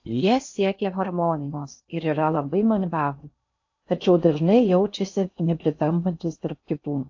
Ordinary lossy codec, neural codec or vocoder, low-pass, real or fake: AAC, 48 kbps; codec, 16 kHz in and 24 kHz out, 0.6 kbps, FocalCodec, streaming, 4096 codes; 7.2 kHz; fake